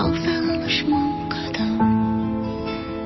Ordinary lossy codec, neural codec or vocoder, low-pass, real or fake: MP3, 24 kbps; none; 7.2 kHz; real